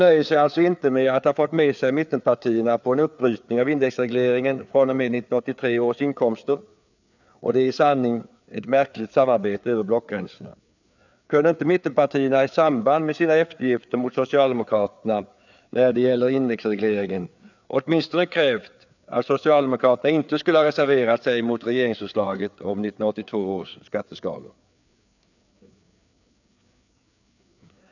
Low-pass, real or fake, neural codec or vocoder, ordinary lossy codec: 7.2 kHz; fake; codec, 16 kHz, 4 kbps, FreqCodec, larger model; none